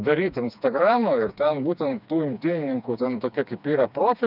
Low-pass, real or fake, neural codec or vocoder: 5.4 kHz; fake; codec, 16 kHz, 2 kbps, FreqCodec, smaller model